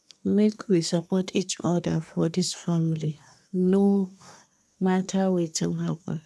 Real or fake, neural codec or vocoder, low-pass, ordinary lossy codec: fake; codec, 24 kHz, 1 kbps, SNAC; none; none